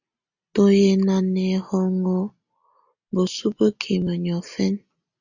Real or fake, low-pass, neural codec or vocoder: real; 7.2 kHz; none